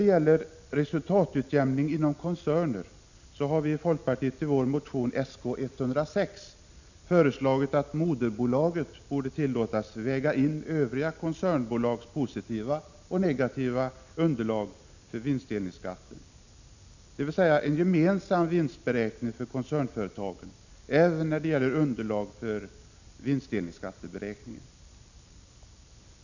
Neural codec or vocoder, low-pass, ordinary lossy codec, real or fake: none; 7.2 kHz; none; real